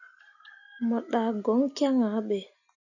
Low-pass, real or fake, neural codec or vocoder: 7.2 kHz; real; none